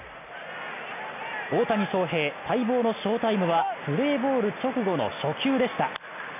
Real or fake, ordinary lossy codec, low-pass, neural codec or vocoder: real; none; 3.6 kHz; none